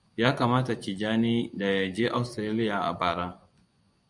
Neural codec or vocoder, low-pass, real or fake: vocoder, 24 kHz, 100 mel bands, Vocos; 10.8 kHz; fake